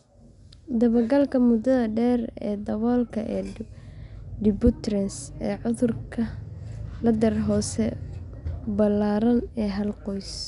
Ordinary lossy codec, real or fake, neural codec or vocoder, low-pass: none; real; none; 10.8 kHz